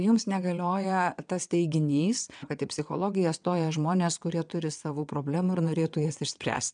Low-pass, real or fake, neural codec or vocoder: 9.9 kHz; fake; vocoder, 22.05 kHz, 80 mel bands, WaveNeXt